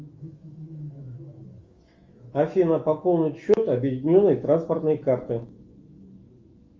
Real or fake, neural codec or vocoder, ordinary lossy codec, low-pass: fake; autoencoder, 48 kHz, 128 numbers a frame, DAC-VAE, trained on Japanese speech; Opus, 32 kbps; 7.2 kHz